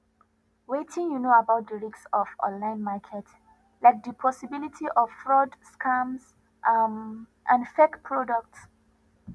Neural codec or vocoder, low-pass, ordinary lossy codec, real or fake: none; none; none; real